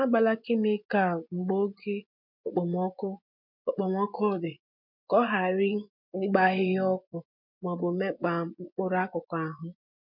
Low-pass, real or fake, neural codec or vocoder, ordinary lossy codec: 5.4 kHz; fake; vocoder, 24 kHz, 100 mel bands, Vocos; MP3, 48 kbps